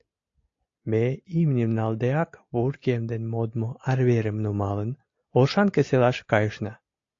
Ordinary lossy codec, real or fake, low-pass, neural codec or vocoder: AAC, 48 kbps; real; 7.2 kHz; none